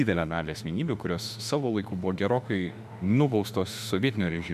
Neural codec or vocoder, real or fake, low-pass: autoencoder, 48 kHz, 32 numbers a frame, DAC-VAE, trained on Japanese speech; fake; 14.4 kHz